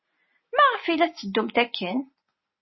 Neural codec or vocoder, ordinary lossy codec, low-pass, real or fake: none; MP3, 24 kbps; 7.2 kHz; real